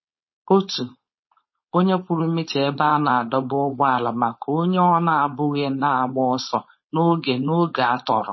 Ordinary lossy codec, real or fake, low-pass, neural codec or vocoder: MP3, 24 kbps; fake; 7.2 kHz; codec, 16 kHz, 4.8 kbps, FACodec